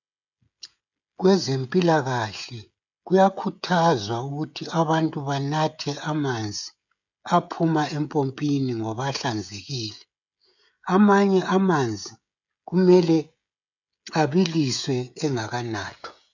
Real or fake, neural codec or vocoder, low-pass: fake; codec, 16 kHz, 16 kbps, FreqCodec, smaller model; 7.2 kHz